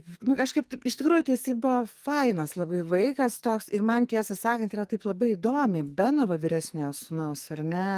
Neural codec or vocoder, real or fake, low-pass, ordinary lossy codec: codec, 44.1 kHz, 2.6 kbps, SNAC; fake; 14.4 kHz; Opus, 32 kbps